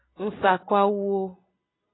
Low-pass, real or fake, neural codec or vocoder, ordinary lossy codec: 7.2 kHz; real; none; AAC, 16 kbps